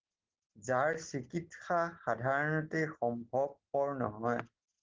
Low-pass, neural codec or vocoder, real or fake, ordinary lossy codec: 7.2 kHz; none; real; Opus, 16 kbps